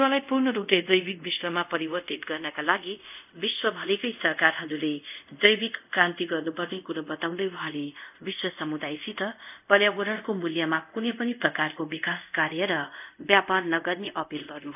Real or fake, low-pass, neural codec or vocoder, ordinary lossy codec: fake; 3.6 kHz; codec, 24 kHz, 0.5 kbps, DualCodec; none